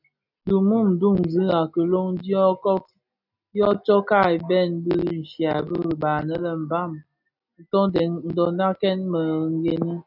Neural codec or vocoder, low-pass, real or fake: none; 5.4 kHz; real